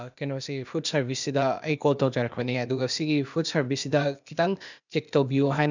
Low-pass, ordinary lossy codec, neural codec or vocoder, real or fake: 7.2 kHz; none; codec, 16 kHz, 0.8 kbps, ZipCodec; fake